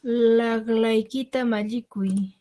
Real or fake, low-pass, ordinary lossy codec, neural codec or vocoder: real; 10.8 kHz; Opus, 16 kbps; none